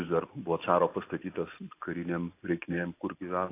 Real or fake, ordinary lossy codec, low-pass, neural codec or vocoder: real; AAC, 24 kbps; 3.6 kHz; none